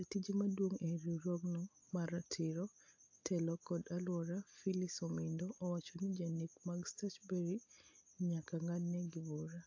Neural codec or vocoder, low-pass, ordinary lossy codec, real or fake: none; 7.2 kHz; AAC, 48 kbps; real